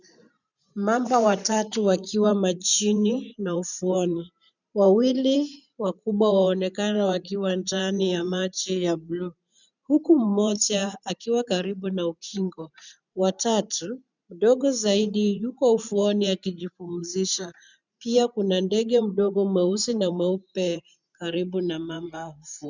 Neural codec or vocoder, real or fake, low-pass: vocoder, 44.1 kHz, 128 mel bands every 512 samples, BigVGAN v2; fake; 7.2 kHz